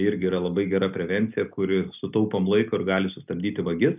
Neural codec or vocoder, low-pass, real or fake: none; 3.6 kHz; real